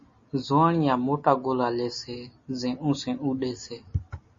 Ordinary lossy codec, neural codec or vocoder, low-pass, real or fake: MP3, 32 kbps; none; 7.2 kHz; real